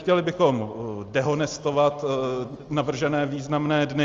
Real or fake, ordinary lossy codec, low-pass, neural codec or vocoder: real; Opus, 32 kbps; 7.2 kHz; none